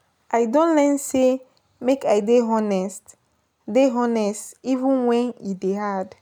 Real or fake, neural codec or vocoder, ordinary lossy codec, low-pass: real; none; none; 19.8 kHz